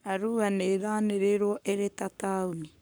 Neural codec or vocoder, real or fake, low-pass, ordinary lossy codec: codec, 44.1 kHz, 7.8 kbps, Pupu-Codec; fake; none; none